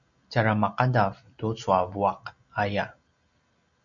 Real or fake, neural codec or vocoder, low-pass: real; none; 7.2 kHz